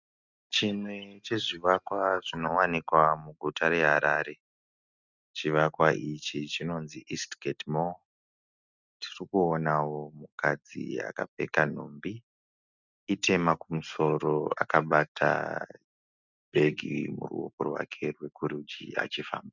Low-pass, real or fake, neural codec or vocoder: 7.2 kHz; real; none